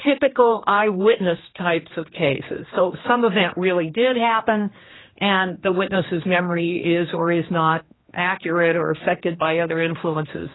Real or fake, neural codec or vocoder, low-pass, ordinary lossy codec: fake; codec, 16 kHz, 2 kbps, X-Codec, HuBERT features, trained on general audio; 7.2 kHz; AAC, 16 kbps